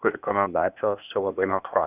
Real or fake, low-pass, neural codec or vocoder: fake; 3.6 kHz; codec, 16 kHz, 0.8 kbps, ZipCodec